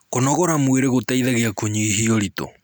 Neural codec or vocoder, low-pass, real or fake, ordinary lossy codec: none; none; real; none